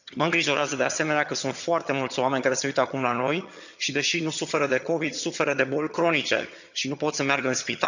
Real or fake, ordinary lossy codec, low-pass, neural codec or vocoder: fake; none; 7.2 kHz; vocoder, 22.05 kHz, 80 mel bands, HiFi-GAN